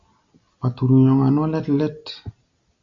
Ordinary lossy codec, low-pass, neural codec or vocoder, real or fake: Opus, 64 kbps; 7.2 kHz; none; real